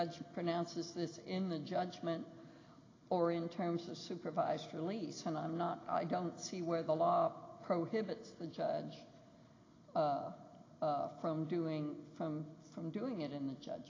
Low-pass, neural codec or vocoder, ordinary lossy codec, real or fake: 7.2 kHz; none; AAC, 32 kbps; real